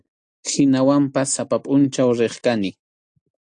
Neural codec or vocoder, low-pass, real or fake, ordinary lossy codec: none; 9.9 kHz; real; AAC, 64 kbps